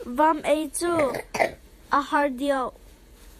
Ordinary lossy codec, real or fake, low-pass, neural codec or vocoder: AAC, 96 kbps; fake; 14.4 kHz; vocoder, 48 kHz, 128 mel bands, Vocos